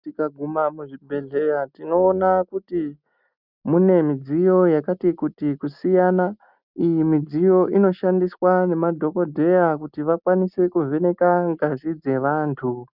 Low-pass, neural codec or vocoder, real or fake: 5.4 kHz; none; real